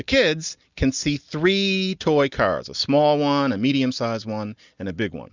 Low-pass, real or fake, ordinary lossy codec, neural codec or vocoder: 7.2 kHz; real; Opus, 64 kbps; none